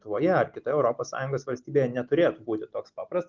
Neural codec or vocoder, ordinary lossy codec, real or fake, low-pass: none; Opus, 24 kbps; real; 7.2 kHz